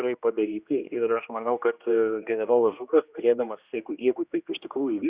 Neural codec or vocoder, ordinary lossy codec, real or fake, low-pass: codec, 16 kHz, 1 kbps, X-Codec, HuBERT features, trained on general audio; Opus, 64 kbps; fake; 3.6 kHz